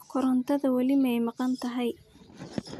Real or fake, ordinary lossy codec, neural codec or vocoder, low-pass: real; none; none; 14.4 kHz